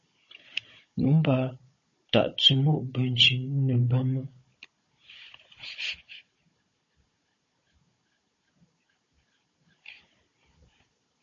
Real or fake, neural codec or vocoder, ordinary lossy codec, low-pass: fake; codec, 16 kHz, 16 kbps, FunCodec, trained on Chinese and English, 50 frames a second; MP3, 32 kbps; 7.2 kHz